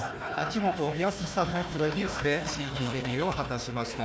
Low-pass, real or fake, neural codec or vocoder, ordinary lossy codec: none; fake; codec, 16 kHz, 1 kbps, FunCodec, trained on Chinese and English, 50 frames a second; none